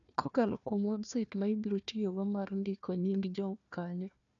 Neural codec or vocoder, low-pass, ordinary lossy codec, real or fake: codec, 16 kHz, 1 kbps, FunCodec, trained on Chinese and English, 50 frames a second; 7.2 kHz; none; fake